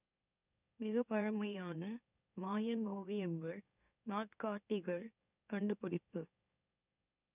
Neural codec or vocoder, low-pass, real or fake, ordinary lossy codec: autoencoder, 44.1 kHz, a latent of 192 numbers a frame, MeloTTS; 3.6 kHz; fake; none